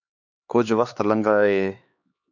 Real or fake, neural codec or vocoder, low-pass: fake; codec, 16 kHz, 2 kbps, X-Codec, HuBERT features, trained on LibriSpeech; 7.2 kHz